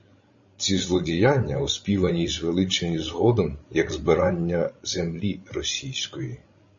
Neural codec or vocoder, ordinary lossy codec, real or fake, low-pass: codec, 16 kHz, 16 kbps, FreqCodec, larger model; MP3, 32 kbps; fake; 7.2 kHz